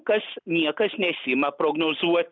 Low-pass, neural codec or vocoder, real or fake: 7.2 kHz; none; real